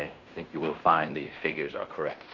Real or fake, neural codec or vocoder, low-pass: fake; codec, 16 kHz in and 24 kHz out, 0.9 kbps, LongCat-Audio-Codec, fine tuned four codebook decoder; 7.2 kHz